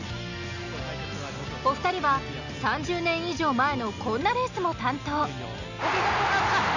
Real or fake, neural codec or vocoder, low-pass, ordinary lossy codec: real; none; 7.2 kHz; none